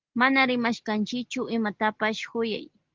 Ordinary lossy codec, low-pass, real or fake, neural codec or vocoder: Opus, 16 kbps; 7.2 kHz; real; none